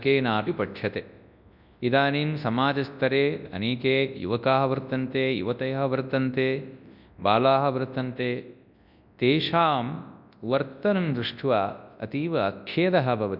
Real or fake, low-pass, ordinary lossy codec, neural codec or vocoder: fake; 5.4 kHz; none; codec, 24 kHz, 0.9 kbps, WavTokenizer, large speech release